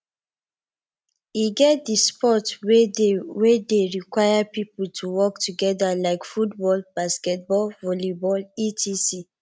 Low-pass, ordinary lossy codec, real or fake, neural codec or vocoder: none; none; real; none